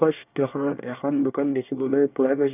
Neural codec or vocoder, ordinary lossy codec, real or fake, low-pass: codec, 24 kHz, 1 kbps, SNAC; none; fake; 3.6 kHz